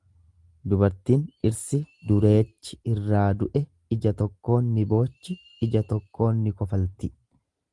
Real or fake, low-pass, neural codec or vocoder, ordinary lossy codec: real; 10.8 kHz; none; Opus, 24 kbps